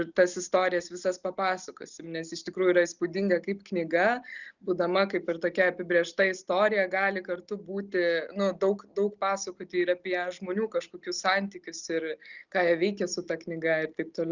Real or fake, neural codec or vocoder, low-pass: real; none; 7.2 kHz